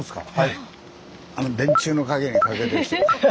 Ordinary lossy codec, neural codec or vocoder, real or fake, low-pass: none; none; real; none